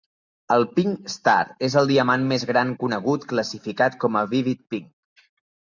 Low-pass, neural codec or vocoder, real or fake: 7.2 kHz; none; real